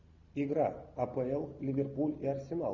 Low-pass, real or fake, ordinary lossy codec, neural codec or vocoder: 7.2 kHz; real; Opus, 64 kbps; none